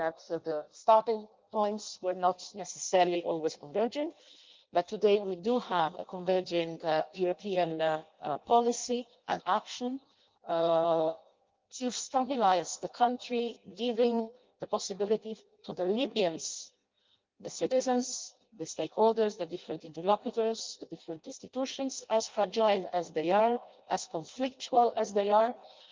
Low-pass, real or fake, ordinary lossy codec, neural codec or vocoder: 7.2 kHz; fake; Opus, 24 kbps; codec, 16 kHz in and 24 kHz out, 0.6 kbps, FireRedTTS-2 codec